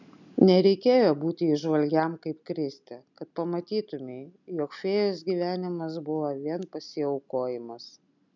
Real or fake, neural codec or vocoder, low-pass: real; none; 7.2 kHz